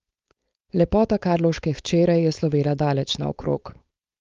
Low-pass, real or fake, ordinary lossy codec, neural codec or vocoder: 7.2 kHz; fake; Opus, 32 kbps; codec, 16 kHz, 4.8 kbps, FACodec